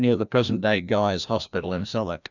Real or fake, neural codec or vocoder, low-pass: fake; codec, 16 kHz, 1 kbps, FreqCodec, larger model; 7.2 kHz